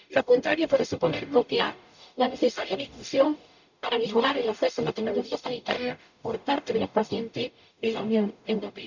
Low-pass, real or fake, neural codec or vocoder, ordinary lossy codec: 7.2 kHz; fake; codec, 44.1 kHz, 0.9 kbps, DAC; none